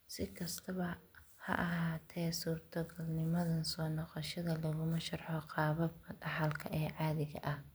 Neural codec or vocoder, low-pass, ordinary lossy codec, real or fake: none; none; none; real